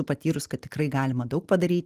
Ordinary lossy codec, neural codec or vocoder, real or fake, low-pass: Opus, 24 kbps; none; real; 14.4 kHz